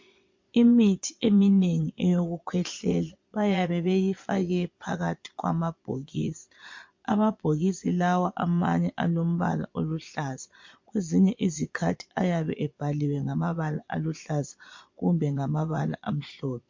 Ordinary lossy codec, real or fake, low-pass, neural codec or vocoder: MP3, 48 kbps; fake; 7.2 kHz; vocoder, 22.05 kHz, 80 mel bands, Vocos